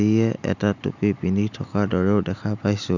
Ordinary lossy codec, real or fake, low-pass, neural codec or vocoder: none; real; 7.2 kHz; none